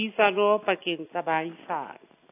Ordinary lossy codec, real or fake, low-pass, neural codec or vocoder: AAC, 24 kbps; real; 3.6 kHz; none